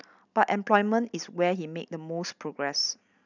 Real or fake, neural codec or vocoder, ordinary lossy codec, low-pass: real; none; none; 7.2 kHz